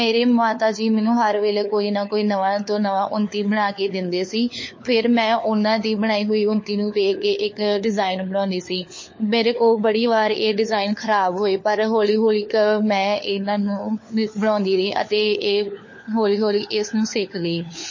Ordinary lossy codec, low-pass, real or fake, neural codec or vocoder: MP3, 32 kbps; 7.2 kHz; fake; codec, 16 kHz, 4 kbps, FunCodec, trained on LibriTTS, 50 frames a second